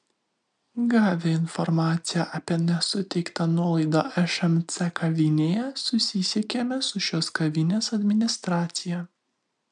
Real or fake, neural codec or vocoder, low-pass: real; none; 9.9 kHz